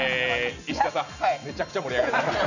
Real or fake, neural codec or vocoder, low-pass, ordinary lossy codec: real; none; 7.2 kHz; none